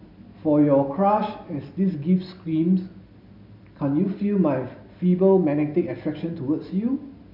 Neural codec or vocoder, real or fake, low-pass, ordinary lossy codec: none; real; 5.4 kHz; none